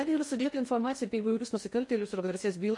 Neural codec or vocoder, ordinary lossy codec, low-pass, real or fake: codec, 16 kHz in and 24 kHz out, 0.6 kbps, FocalCodec, streaming, 2048 codes; MP3, 48 kbps; 10.8 kHz; fake